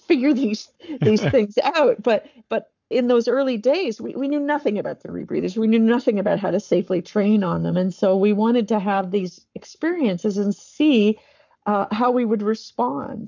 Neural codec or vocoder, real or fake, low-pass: codec, 44.1 kHz, 7.8 kbps, Pupu-Codec; fake; 7.2 kHz